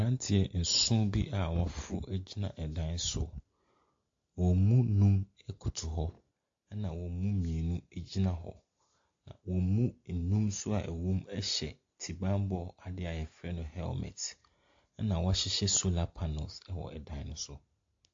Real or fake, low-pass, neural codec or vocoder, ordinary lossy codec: real; 7.2 kHz; none; AAC, 48 kbps